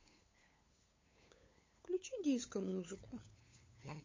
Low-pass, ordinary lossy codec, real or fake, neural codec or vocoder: 7.2 kHz; MP3, 32 kbps; fake; codec, 16 kHz, 8 kbps, FunCodec, trained on LibriTTS, 25 frames a second